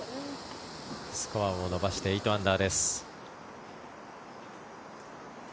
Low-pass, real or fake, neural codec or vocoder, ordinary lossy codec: none; real; none; none